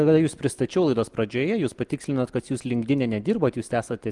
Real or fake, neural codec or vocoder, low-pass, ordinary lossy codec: real; none; 10.8 kHz; Opus, 24 kbps